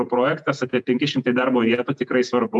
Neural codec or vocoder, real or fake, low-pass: vocoder, 48 kHz, 128 mel bands, Vocos; fake; 10.8 kHz